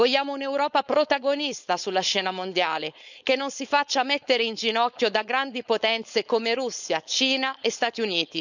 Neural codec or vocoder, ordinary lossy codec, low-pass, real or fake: codec, 16 kHz, 4.8 kbps, FACodec; none; 7.2 kHz; fake